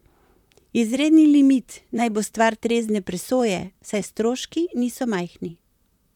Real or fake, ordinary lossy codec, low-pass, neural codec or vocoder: fake; none; 19.8 kHz; vocoder, 44.1 kHz, 128 mel bands, Pupu-Vocoder